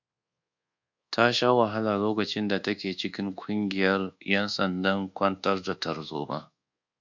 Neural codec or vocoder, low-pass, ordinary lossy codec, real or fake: codec, 24 kHz, 1.2 kbps, DualCodec; 7.2 kHz; MP3, 64 kbps; fake